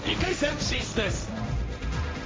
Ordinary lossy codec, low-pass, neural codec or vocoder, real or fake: none; none; codec, 16 kHz, 1.1 kbps, Voila-Tokenizer; fake